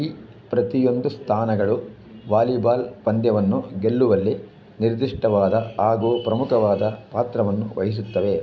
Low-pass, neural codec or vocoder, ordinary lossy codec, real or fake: none; none; none; real